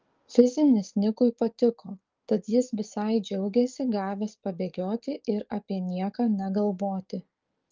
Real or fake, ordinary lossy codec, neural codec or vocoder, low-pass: fake; Opus, 32 kbps; codec, 44.1 kHz, 7.8 kbps, DAC; 7.2 kHz